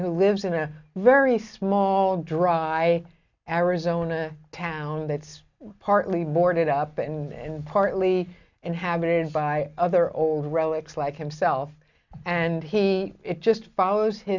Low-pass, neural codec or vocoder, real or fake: 7.2 kHz; none; real